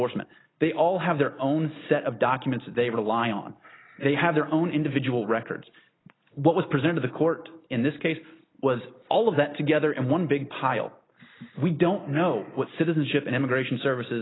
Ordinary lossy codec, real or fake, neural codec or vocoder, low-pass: AAC, 16 kbps; real; none; 7.2 kHz